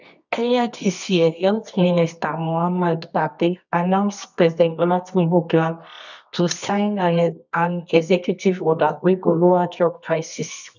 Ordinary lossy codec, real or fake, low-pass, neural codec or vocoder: none; fake; 7.2 kHz; codec, 24 kHz, 0.9 kbps, WavTokenizer, medium music audio release